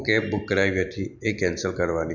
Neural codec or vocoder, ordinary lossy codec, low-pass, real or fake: none; none; 7.2 kHz; real